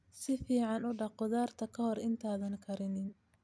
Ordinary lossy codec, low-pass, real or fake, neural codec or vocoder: none; none; real; none